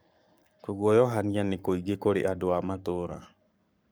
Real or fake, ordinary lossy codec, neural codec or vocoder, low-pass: fake; none; codec, 44.1 kHz, 7.8 kbps, Pupu-Codec; none